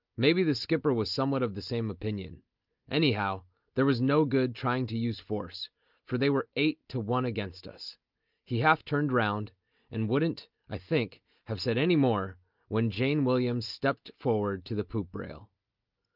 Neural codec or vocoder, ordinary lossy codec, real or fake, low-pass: none; Opus, 24 kbps; real; 5.4 kHz